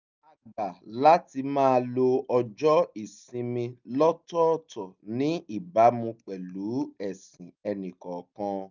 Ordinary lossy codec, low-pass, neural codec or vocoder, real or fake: none; 7.2 kHz; none; real